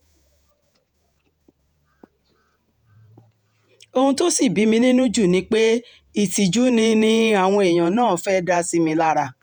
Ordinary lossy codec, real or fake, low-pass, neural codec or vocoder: none; fake; 19.8 kHz; vocoder, 48 kHz, 128 mel bands, Vocos